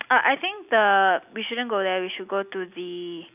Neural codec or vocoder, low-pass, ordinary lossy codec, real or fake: none; 3.6 kHz; none; real